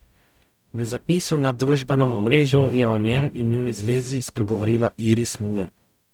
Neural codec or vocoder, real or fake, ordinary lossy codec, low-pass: codec, 44.1 kHz, 0.9 kbps, DAC; fake; none; 19.8 kHz